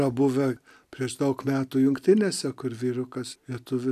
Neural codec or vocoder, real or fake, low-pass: none; real; 14.4 kHz